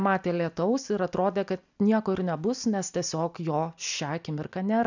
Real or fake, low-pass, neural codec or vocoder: real; 7.2 kHz; none